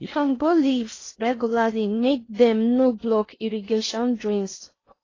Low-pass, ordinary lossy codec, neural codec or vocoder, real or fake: 7.2 kHz; AAC, 32 kbps; codec, 16 kHz in and 24 kHz out, 0.6 kbps, FocalCodec, streaming, 4096 codes; fake